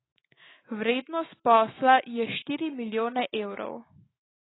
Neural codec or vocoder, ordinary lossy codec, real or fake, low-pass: autoencoder, 48 kHz, 128 numbers a frame, DAC-VAE, trained on Japanese speech; AAC, 16 kbps; fake; 7.2 kHz